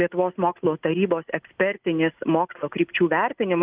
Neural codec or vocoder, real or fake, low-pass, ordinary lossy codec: none; real; 3.6 kHz; Opus, 32 kbps